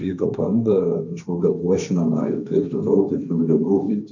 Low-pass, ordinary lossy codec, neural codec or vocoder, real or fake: 7.2 kHz; MP3, 64 kbps; codec, 16 kHz, 1.1 kbps, Voila-Tokenizer; fake